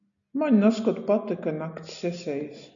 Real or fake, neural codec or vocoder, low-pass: real; none; 7.2 kHz